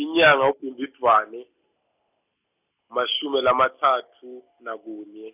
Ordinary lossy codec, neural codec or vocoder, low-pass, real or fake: none; none; 3.6 kHz; real